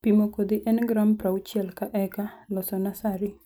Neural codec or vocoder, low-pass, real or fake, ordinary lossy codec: none; none; real; none